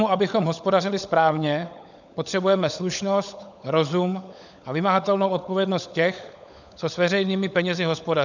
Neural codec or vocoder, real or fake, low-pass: codec, 16 kHz, 16 kbps, FunCodec, trained on LibriTTS, 50 frames a second; fake; 7.2 kHz